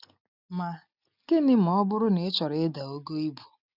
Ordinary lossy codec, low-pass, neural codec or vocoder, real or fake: none; 5.4 kHz; none; real